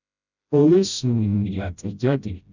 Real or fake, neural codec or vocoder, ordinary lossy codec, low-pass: fake; codec, 16 kHz, 0.5 kbps, FreqCodec, smaller model; none; 7.2 kHz